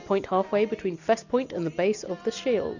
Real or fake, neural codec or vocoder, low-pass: real; none; 7.2 kHz